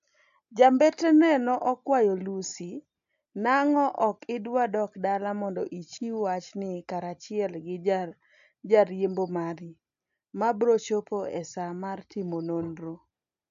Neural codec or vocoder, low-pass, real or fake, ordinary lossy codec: none; 7.2 kHz; real; AAC, 64 kbps